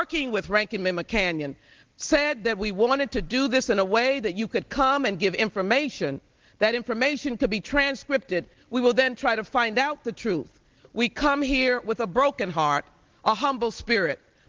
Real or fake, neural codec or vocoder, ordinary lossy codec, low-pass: real; none; Opus, 16 kbps; 7.2 kHz